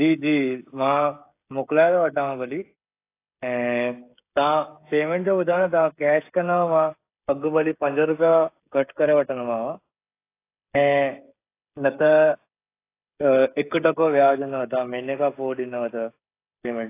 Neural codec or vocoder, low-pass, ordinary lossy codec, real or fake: codec, 16 kHz, 16 kbps, FreqCodec, smaller model; 3.6 kHz; AAC, 24 kbps; fake